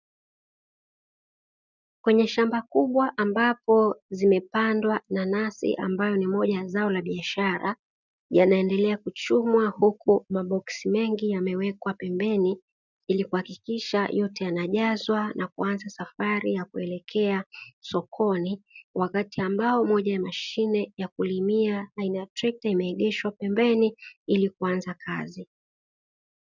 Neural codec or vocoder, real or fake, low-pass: none; real; 7.2 kHz